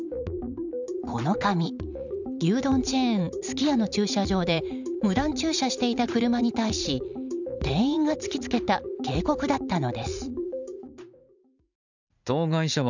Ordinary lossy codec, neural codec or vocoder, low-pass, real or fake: none; vocoder, 44.1 kHz, 80 mel bands, Vocos; 7.2 kHz; fake